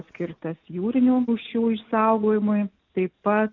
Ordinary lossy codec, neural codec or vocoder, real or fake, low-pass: MP3, 64 kbps; none; real; 7.2 kHz